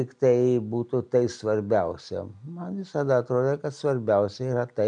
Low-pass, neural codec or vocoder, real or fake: 9.9 kHz; none; real